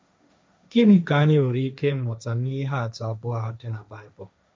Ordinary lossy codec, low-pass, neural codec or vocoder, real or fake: none; none; codec, 16 kHz, 1.1 kbps, Voila-Tokenizer; fake